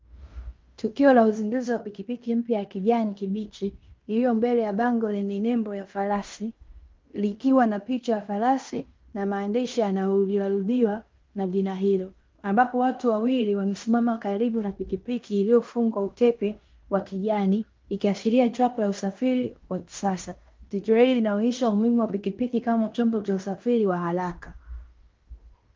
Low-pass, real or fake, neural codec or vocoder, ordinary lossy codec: 7.2 kHz; fake; codec, 16 kHz in and 24 kHz out, 0.9 kbps, LongCat-Audio-Codec, fine tuned four codebook decoder; Opus, 32 kbps